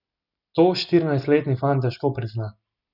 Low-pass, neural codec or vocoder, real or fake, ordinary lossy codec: 5.4 kHz; none; real; none